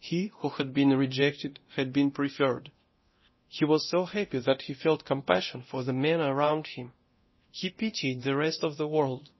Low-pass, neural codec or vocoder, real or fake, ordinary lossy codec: 7.2 kHz; codec, 24 kHz, 0.9 kbps, DualCodec; fake; MP3, 24 kbps